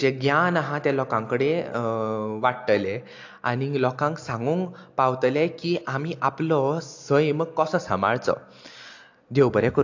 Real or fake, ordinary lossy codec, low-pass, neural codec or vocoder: real; AAC, 48 kbps; 7.2 kHz; none